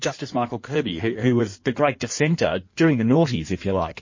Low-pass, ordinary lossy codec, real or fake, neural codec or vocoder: 7.2 kHz; MP3, 32 kbps; fake; codec, 16 kHz in and 24 kHz out, 1.1 kbps, FireRedTTS-2 codec